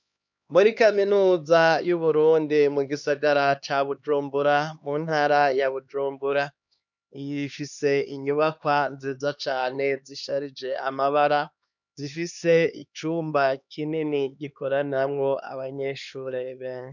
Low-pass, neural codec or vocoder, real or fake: 7.2 kHz; codec, 16 kHz, 2 kbps, X-Codec, HuBERT features, trained on LibriSpeech; fake